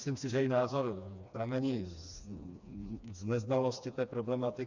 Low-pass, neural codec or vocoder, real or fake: 7.2 kHz; codec, 16 kHz, 2 kbps, FreqCodec, smaller model; fake